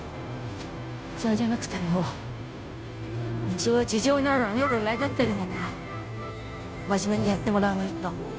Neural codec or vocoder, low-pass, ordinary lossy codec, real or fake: codec, 16 kHz, 0.5 kbps, FunCodec, trained on Chinese and English, 25 frames a second; none; none; fake